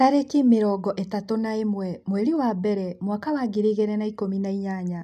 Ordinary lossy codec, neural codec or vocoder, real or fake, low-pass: none; none; real; 14.4 kHz